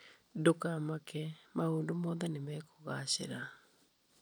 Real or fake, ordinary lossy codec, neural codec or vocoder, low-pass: real; none; none; none